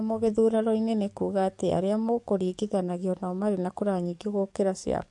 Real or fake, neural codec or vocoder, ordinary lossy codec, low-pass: fake; codec, 44.1 kHz, 7.8 kbps, DAC; MP3, 64 kbps; 10.8 kHz